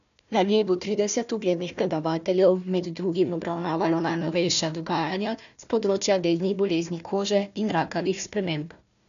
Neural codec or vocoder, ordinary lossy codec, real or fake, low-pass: codec, 16 kHz, 1 kbps, FunCodec, trained on Chinese and English, 50 frames a second; none; fake; 7.2 kHz